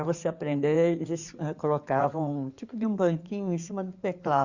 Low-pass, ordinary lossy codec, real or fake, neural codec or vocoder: 7.2 kHz; Opus, 64 kbps; fake; codec, 16 kHz in and 24 kHz out, 2.2 kbps, FireRedTTS-2 codec